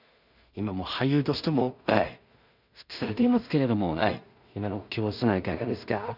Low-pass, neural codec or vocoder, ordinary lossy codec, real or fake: 5.4 kHz; codec, 16 kHz in and 24 kHz out, 0.4 kbps, LongCat-Audio-Codec, two codebook decoder; none; fake